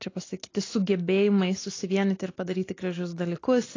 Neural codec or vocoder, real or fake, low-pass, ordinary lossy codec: none; real; 7.2 kHz; AAC, 32 kbps